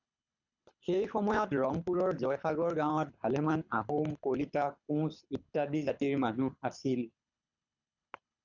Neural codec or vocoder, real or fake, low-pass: codec, 24 kHz, 6 kbps, HILCodec; fake; 7.2 kHz